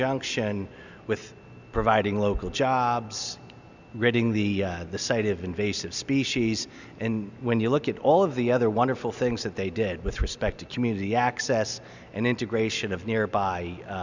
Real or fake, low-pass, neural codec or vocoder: real; 7.2 kHz; none